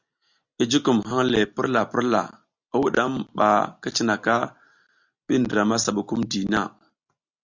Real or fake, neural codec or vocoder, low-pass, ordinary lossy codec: real; none; 7.2 kHz; Opus, 64 kbps